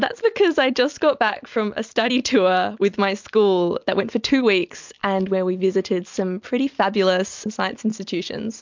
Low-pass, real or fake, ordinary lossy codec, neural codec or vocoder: 7.2 kHz; real; MP3, 64 kbps; none